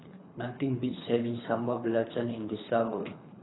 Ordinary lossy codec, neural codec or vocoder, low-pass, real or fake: AAC, 16 kbps; codec, 24 kHz, 3 kbps, HILCodec; 7.2 kHz; fake